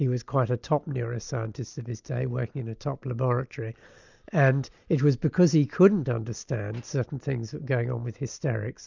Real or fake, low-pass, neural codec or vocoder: real; 7.2 kHz; none